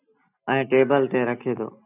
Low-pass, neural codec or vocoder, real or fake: 3.6 kHz; none; real